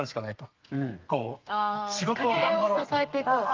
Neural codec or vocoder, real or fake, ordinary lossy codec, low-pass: codec, 32 kHz, 1.9 kbps, SNAC; fake; Opus, 32 kbps; 7.2 kHz